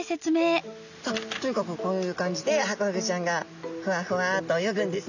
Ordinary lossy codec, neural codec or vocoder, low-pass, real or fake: none; none; 7.2 kHz; real